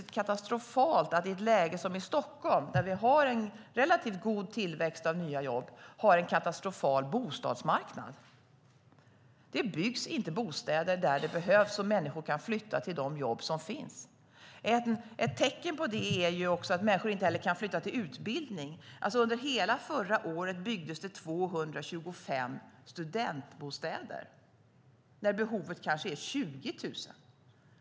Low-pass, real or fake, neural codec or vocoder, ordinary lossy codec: none; real; none; none